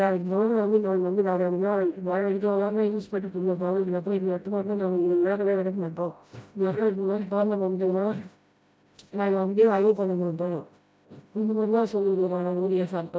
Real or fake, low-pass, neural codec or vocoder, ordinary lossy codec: fake; none; codec, 16 kHz, 0.5 kbps, FreqCodec, smaller model; none